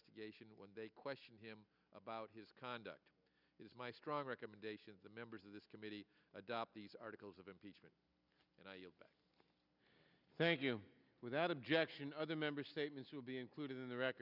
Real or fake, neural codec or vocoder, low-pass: real; none; 5.4 kHz